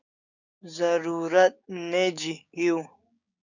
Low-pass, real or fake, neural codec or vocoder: 7.2 kHz; fake; codec, 16 kHz, 6 kbps, DAC